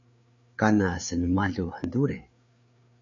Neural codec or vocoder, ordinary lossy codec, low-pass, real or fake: codec, 16 kHz, 4 kbps, FreqCodec, larger model; AAC, 64 kbps; 7.2 kHz; fake